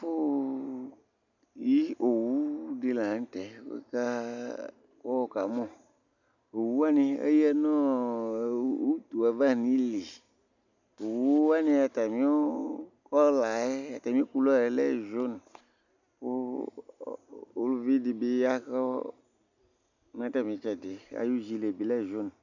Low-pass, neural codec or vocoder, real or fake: 7.2 kHz; none; real